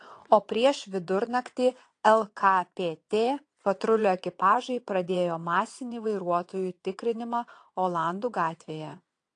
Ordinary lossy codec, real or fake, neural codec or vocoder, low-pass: AAC, 48 kbps; fake; vocoder, 22.05 kHz, 80 mel bands, WaveNeXt; 9.9 kHz